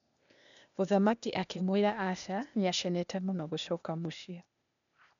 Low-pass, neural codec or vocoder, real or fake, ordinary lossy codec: 7.2 kHz; codec, 16 kHz, 0.8 kbps, ZipCodec; fake; none